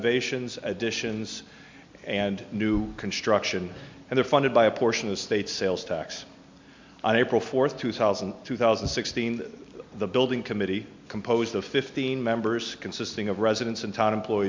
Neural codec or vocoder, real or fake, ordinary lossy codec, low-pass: none; real; MP3, 64 kbps; 7.2 kHz